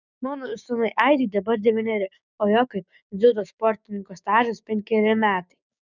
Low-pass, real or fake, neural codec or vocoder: 7.2 kHz; fake; codec, 16 kHz, 6 kbps, DAC